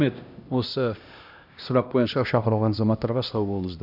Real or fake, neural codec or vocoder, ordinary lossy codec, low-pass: fake; codec, 16 kHz, 1 kbps, X-Codec, HuBERT features, trained on LibriSpeech; none; 5.4 kHz